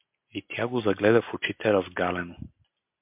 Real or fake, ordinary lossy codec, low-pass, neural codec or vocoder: real; MP3, 32 kbps; 3.6 kHz; none